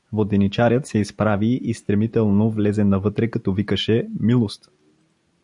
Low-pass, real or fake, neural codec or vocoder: 10.8 kHz; real; none